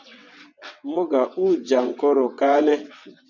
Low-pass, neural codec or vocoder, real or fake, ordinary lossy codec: 7.2 kHz; vocoder, 22.05 kHz, 80 mel bands, WaveNeXt; fake; MP3, 64 kbps